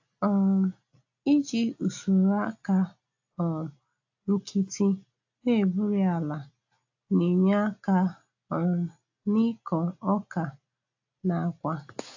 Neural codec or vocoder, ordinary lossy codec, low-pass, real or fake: none; AAC, 48 kbps; 7.2 kHz; real